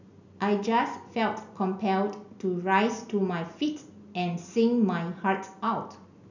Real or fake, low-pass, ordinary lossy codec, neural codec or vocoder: real; 7.2 kHz; none; none